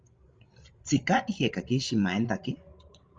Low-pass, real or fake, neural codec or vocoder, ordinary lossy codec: 7.2 kHz; fake; codec, 16 kHz, 16 kbps, FreqCodec, larger model; Opus, 32 kbps